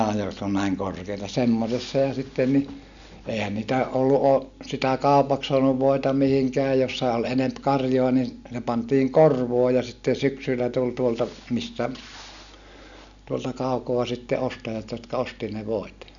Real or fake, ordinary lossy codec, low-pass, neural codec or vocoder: real; none; 7.2 kHz; none